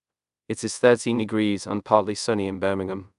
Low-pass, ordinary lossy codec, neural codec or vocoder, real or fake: 10.8 kHz; none; codec, 24 kHz, 0.5 kbps, DualCodec; fake